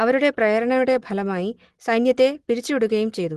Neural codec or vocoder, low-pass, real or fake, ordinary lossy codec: none; 10.8 kHz; real; Opus, 16 kbps